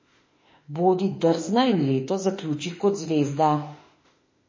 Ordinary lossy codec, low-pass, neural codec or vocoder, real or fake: MP3, 32 kbps; 7.2 kHz; autoencoder, 48 kHz, 32 numbers a frame, DAC-VAE, trained on Japanese speech; fake